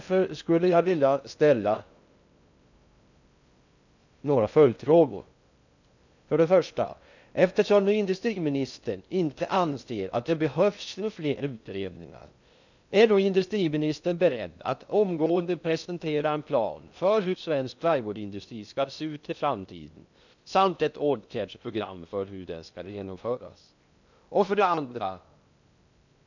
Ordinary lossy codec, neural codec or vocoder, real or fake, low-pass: none; codec, 16 kHz in and 24 kHz out, 0.6 kbps, FocalCodec, streaming, 2048 codes; fake; 7.2 kHz